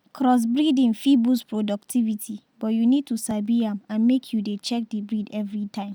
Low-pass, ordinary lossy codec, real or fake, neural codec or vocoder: none; none; real; none